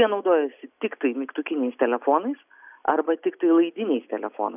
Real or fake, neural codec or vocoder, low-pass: real; none; 3.6 kHz